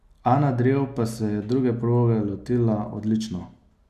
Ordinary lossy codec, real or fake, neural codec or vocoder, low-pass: none; real; none; 14.4 kHz